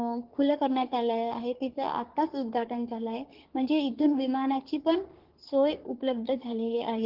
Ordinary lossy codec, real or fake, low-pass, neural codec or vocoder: Opus, 16 kbps; fake; 5.4 kHz; codec, 44.1 kHz, 3.4 kbps, Pupu-Codec